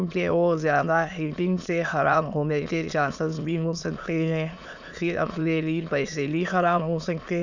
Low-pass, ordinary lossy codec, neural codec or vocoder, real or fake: 7.2 kHz; none; autoencoder, 22.05 kHz, a latent of 192 numbers a frame, VITS, trained on many speakers; fake